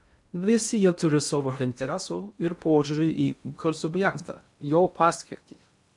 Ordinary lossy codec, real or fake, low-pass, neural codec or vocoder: MP3, 96 kbps; fake; 10.8 kHz; codec, 16 kHz in and 24 kHz out, 0.6 kbps, FocalCodec, streaming, 2048 codes